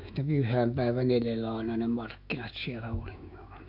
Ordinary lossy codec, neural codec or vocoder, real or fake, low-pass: none; autoencoder, 48 kHz, 128 numbers a frame, DAC-VAE, trained on Japanese speech; fake; 5.4 kHz